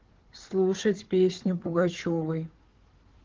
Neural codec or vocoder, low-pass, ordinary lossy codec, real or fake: codec, 16 kHz, 4 kbps, FunCodec, trained on Chinese and English, 50 frames a second; 7.2 kHz; Opus, 16 kbps; fake